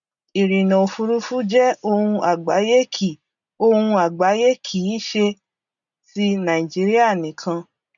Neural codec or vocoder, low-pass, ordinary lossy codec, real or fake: none; 7.2 kHz; none; real